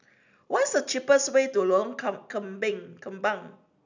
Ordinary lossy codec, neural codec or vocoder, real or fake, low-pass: none; none; real; 7.2 kHz